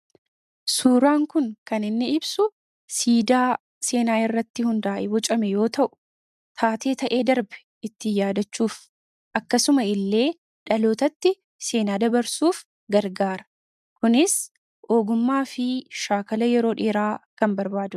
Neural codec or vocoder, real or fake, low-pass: none; real; 14.4 kHz